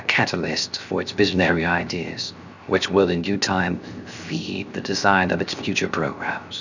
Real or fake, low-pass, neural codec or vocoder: fake; 7.2 kHz; codec, 16 kHz, 0.7 kbps, FocalCodec